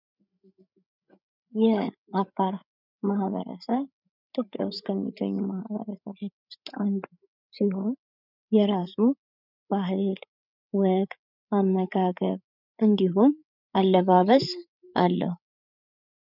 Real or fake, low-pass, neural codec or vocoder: fake; 5.4 kHz; codec, 16 kHz, 8 kbps, FreqCodec, larger model